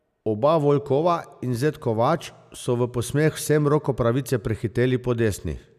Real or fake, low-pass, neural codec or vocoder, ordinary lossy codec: real; 14.4 kHz; none; none